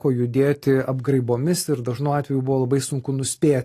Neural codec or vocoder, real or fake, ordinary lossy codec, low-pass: none; real; AAC, 48 kbps; 14.4 kHz